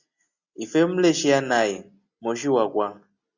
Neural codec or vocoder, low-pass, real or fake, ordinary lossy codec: none; 7.2 kHz; real; Opus, 64 kbps